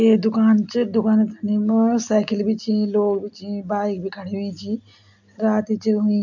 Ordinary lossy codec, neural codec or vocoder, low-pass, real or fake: none; none; 7.2 kHz; real